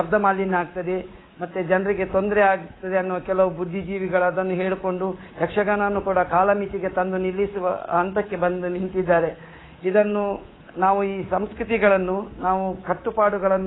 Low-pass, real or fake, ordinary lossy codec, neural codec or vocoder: 7.2 kHz; fake; AAC, 16 kbps; codec, 24 kHz, 3.1 kbps, DualCodec